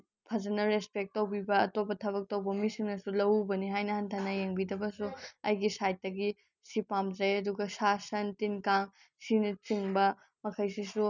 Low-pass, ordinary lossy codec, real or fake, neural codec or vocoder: 7.2 kHz; none; real; none